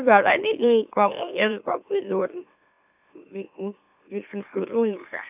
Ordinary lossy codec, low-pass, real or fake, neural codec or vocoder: AAC, 32 kbps; 3.6 kHz; fake; autoencoder, 44.1 kHz, a latent of 192 numbers a frame, MeloTTS